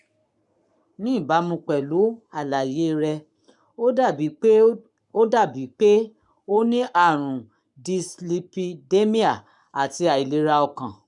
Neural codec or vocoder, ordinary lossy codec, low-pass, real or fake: codec, 44.1 kHz, 7.8 kbps, Pupu-Codec; none; 10.8 kHz; fake